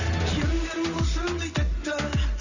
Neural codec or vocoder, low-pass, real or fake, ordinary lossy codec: none; 7.2 kHz; real; none